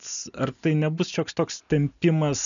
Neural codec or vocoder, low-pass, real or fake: none; 7.2 kHz; real